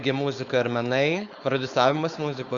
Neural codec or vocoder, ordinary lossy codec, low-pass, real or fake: codec, 16 kHz, 4.8 kbps, FACodec; Opus, 64 kbps; 7.2 kHz; fake